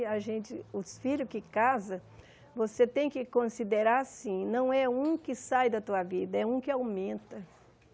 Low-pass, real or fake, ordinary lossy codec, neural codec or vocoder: none; real; none; none